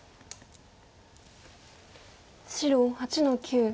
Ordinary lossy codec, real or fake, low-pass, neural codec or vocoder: none; real; none; none